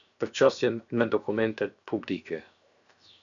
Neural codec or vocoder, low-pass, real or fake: codec, 16 kHz, 0.7 kbps, FocalCodec; 7.2 kHz; fake